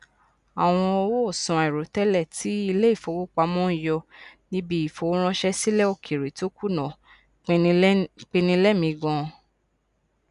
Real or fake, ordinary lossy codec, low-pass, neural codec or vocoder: real; none; 10.8 kHz; none